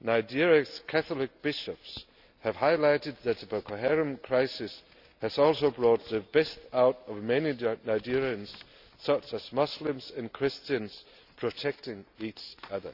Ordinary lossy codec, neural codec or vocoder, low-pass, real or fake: none; none; 5.4 kHz; real